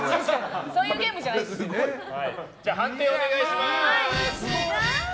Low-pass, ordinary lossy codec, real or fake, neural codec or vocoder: none; none; real; none